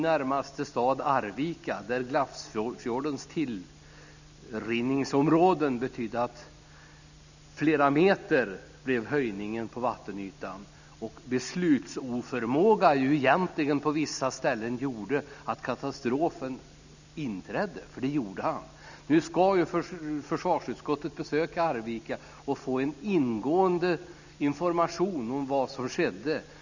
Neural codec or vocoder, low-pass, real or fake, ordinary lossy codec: none; 7.2 kHz; real; none